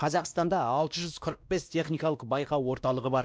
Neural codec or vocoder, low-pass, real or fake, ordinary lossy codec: codec, 16 kHz, 1 kbps, X-Codec, WavLM features, trained on Multilingual LibriSpeech; none; fake; none